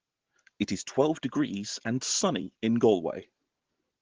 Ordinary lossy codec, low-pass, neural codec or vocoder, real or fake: Opus, 16 kbps; 7.2 kHz; none; real